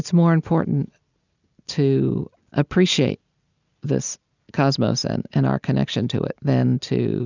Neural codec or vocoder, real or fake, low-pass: none; real; 7.2 kHz